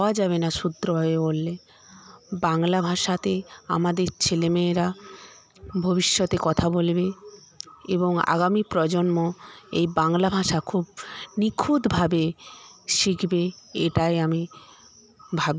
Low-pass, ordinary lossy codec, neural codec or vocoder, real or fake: none; none; none; real